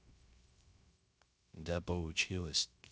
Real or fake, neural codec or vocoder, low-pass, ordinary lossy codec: fake; codec, 16 kHz, 0.3 kbps, FocalCodec; none; none